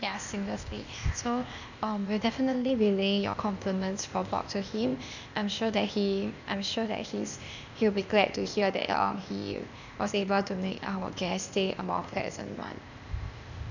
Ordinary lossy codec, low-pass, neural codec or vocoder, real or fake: none; 7.2 kHz; codec, 16 kHz, 0.8 kbps, ZipCodec; fake